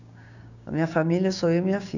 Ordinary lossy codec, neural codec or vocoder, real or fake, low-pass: none; codec, 16 kHz, 6 kbps, DAC; fake; 7.2 kHz